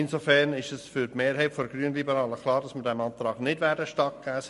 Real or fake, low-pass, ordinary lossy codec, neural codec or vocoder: real; 14.4 kHz; MP3, 48 kbps; none